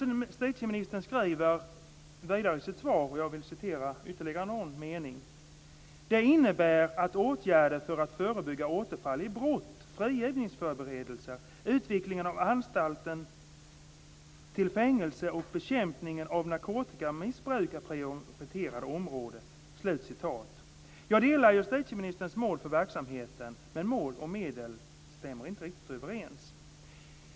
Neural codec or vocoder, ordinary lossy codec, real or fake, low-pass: none; none; real; none